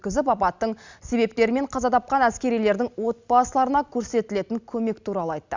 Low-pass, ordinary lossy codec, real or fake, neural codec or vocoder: 7.2 kHz; Opus, 64 kbps; real; none